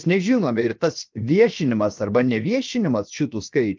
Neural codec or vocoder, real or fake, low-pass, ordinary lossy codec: codec, 16 kHz, 0.7 kbps, FocalCodec; fake; 7.2 kHz; Opus, 32 kbps